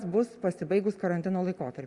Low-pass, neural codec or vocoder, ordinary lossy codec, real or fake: 10.8 kHz; none; Opus, 64 kbps; real